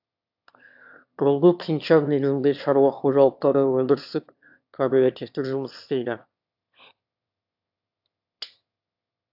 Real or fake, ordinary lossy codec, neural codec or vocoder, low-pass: fake; AAC, 48 kbps; autoencoder, 22.05 kHz, a latent of 192 numbers a frame, VITS, trained on one speaker; 5.4 kHz